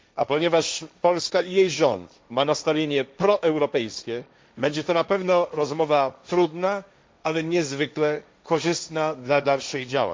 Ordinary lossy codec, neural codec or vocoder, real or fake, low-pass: none; codec, 16 kHz, 1.1 kbps, Voila-Tokenizer; fake; none